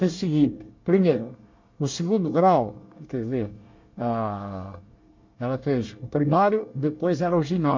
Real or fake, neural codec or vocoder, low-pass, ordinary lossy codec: fake; codec, 24 kHz, 1 kbps, SNAC; 7.2 kHz; MP3, 48 kbps